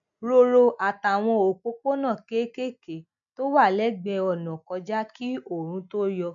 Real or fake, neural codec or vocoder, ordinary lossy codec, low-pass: real; none; none; 7.2 kHz